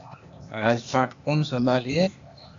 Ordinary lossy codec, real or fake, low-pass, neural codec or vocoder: AAC, 64 kbps; fake; 7.2 kHz; codec, 16 kHz, 0.8 kbps, ZipCodec